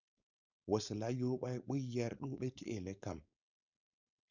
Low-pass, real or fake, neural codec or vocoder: 7.2 kHz; fake; codec, 16 kHz, 4.8 kbps, FACodec